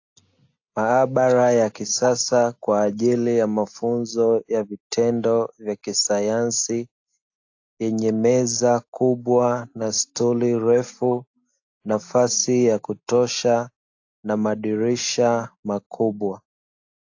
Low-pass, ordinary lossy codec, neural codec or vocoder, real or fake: 7.2 kHz; AAC, 48 kbps; none; real